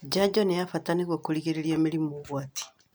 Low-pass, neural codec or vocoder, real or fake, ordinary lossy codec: none; none; real; none